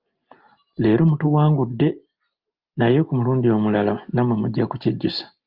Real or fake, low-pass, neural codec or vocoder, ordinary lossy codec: real; 5.4 kHz; none; Opus, 24 kbps